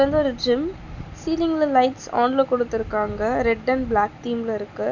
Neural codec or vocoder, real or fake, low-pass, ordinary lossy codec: none; real; 7.2 kHz; none